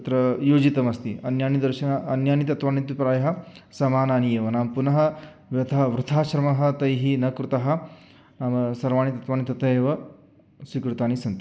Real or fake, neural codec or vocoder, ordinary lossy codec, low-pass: real; none; none; none